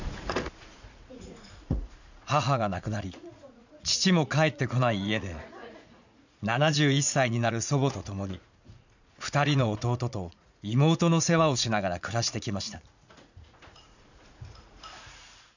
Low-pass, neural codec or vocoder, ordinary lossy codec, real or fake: 7.2 kHz; vocoder, 44.1 kHz, 80 mel bands, Vocos; none; fake